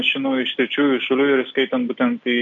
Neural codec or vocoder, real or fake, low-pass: none; real; 7.2 kHz